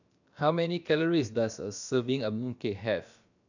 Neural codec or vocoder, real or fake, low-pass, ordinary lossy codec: codec, 16 kHz, about 1 kbps, DyCAST, with the encoder's durations; fake; 7.2 kHz; none